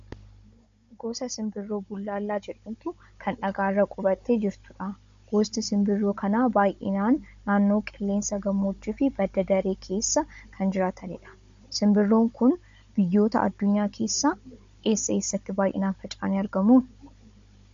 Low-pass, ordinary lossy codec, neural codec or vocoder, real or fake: 7.2 kHz; MP3, 48 kbps; codec, 16 kHz, 16 kbps, FunCodec, trained on Chinese and English, 50 frames a second; fake